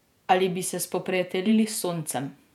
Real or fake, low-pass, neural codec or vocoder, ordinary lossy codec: fake; 19.8 kHz; vocoder, 48 kHz, 128 mel bands, Vocos; none